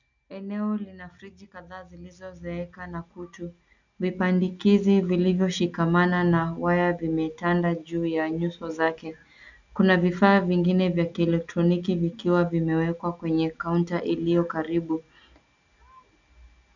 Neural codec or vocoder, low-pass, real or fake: none; 7.2 kHz; real